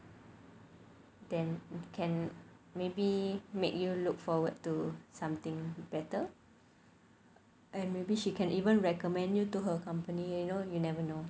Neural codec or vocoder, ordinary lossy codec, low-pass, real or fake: none; none; none; real